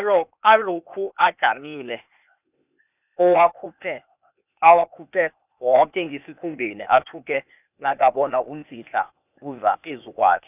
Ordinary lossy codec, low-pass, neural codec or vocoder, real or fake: none; 3.6 kHz; codec, 16 kHz, 0.8 kbps, ZipCodec; fake